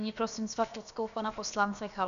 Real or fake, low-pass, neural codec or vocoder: fake; 7.2 kHz; codec, 16 kHz, about 1 kbps, DyCAST, with the encoder's durations